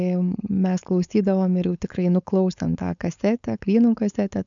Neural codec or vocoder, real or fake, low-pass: codec, 16 kHz, 4.8 kbps, FACodec; fake; 7.2 kHz